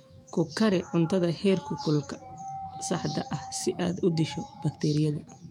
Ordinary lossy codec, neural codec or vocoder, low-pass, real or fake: MP3, 96 kbps; autoencoder, 48 kHz, 128 numbers a frame, DAC-VAE, trained on Japanese speech; 19.8 kHz; fake